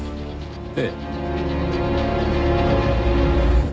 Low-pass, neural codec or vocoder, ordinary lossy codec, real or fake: none; none; none; real